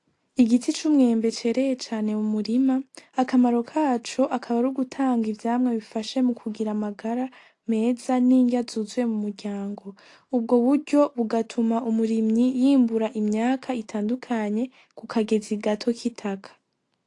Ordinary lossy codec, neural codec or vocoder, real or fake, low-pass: AAC, 48 kbps; none; real; 10.8 kHz